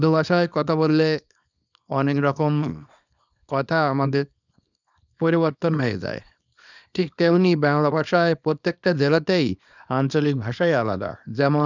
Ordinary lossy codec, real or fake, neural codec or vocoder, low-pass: none; fake; codec, 24 kHz, 0.9 kbps, WavTokenizer, small release; 7.2 kHz